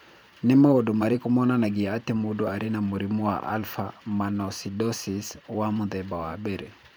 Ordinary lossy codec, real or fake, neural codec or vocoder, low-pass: none; fake; vocoder, 44.1 kHz, 128 mel bands every 512 samples, BigVGAN v2; none